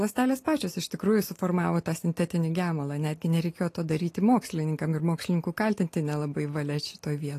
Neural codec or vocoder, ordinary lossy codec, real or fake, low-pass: none; AAC, 48 kbps; real; 14.4 kHz